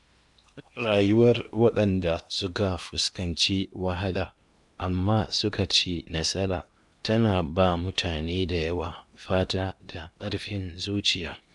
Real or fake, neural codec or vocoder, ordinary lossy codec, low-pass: fake; codec, 16 kHz in and 24 kHz out, 0.8 kbps, FocalCodec, streaming, 65536 codes; none; 10.8 kHz